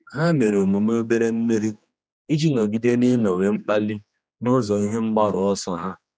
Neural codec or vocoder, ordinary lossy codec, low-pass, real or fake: codec, 16 kHz, 2 kbps, X-Codec, HuBERT features, trained on general audio; none; none; fake